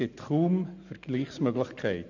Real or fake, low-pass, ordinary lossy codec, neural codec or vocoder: real; 7.2 kHz; none; none